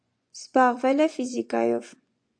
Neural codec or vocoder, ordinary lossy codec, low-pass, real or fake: vocoder, 24 kHz, 100 mel bands, Vocos; AAC, 64 kbps; 9.9 kHz; fake